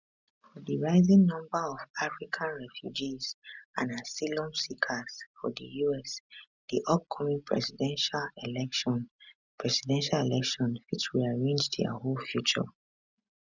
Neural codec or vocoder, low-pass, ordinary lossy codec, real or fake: none; 7.2 kHz; none; real